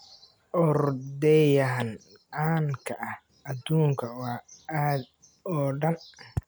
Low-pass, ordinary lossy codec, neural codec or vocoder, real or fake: none; none; none; real